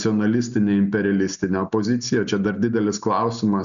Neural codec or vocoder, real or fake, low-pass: none; real; 7.2 kHz